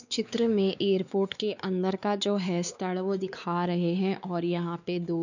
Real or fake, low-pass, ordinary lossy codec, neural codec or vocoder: fake; 7.2 kHz; none; codec, 16 kHz, 4 kbps, X-Codec, WavLM features, trained on Multilingual LibriSpeech